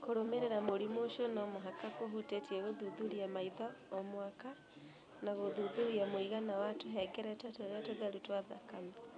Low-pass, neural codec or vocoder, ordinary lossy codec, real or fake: 9.9 kHz; vocoder, 44.1 kHz, 128 mel bands every 256 samples, BigVGAN v2; none; fake